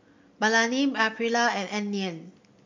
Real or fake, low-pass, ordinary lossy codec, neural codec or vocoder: real; 7.2 kHz; MP3, 48 kbps; none